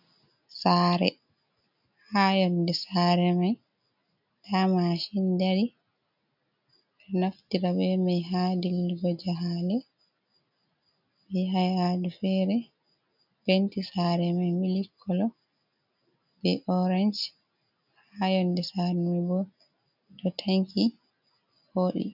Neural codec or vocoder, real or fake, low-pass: none; real; 5.4 kHz